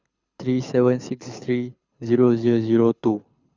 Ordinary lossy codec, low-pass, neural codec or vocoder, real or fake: Opus, 64 kbps; 7.2 kHz; codec, 24 kHz, 6 kbps, HILCodec; fake